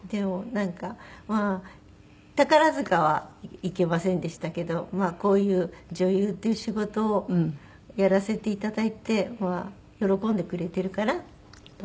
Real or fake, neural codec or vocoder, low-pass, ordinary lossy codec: real; none; none; none